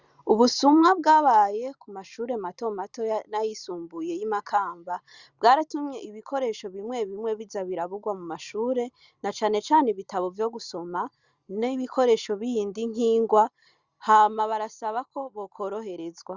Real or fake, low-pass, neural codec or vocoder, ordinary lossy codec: real; 7.2 kHz; none; Opus, 64 kbps